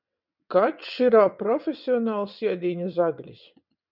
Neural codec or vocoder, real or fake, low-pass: vocoder, 22.05 kHz, 80 mel bands, WaveNeXt; fake; 5.4 kHz